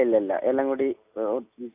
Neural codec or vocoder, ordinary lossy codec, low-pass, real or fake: none; AAC, 32 kbps; 3.6 kHz; real